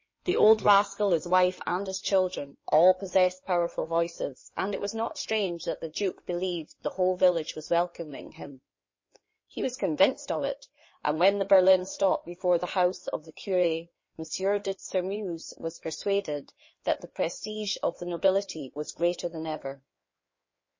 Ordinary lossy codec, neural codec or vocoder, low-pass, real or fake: MP3, 32 kbps; codec, 16 kHz in and 24 kHz out, 2.2 kbps, FireRedTTS-2 codec; 7.2 kHz; fake